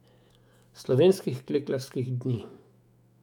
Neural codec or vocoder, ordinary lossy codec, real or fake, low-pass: autoencoder, 48 kHz, 128 numbers a frame, DAC-VAE, trained on Japanese speech; none; fake; 19.8 kHz